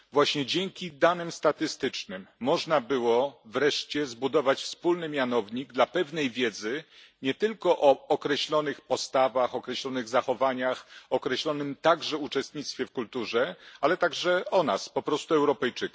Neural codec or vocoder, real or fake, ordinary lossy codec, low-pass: none; real; none; none